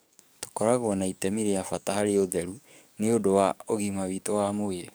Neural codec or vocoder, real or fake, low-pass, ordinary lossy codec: codec, 44.1 kHz, 7.8 kbps, DAC; fake; none; none